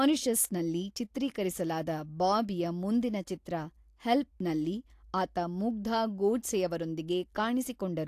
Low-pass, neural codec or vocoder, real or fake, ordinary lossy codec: 14.4 kHz; none; real; AAC, 64 kbps